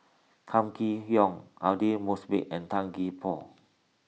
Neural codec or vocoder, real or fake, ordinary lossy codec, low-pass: none; real; none; none